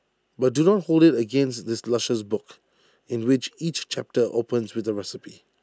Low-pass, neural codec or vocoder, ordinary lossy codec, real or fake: none; none; none; real